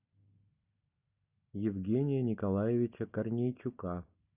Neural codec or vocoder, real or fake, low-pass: none; real; 3.6 kHz